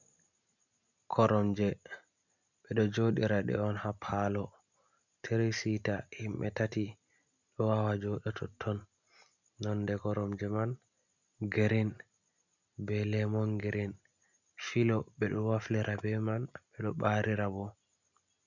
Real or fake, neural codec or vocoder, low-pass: real; none; 7.2 kHz